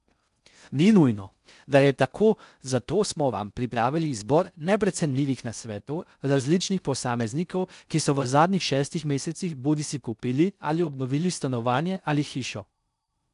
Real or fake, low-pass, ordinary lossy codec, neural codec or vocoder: fake; 10.8 kHz; none; codec, 16 kHz in and 24 kHz out, 0.6 kbps, FocalCodec, streaming, 4096 codes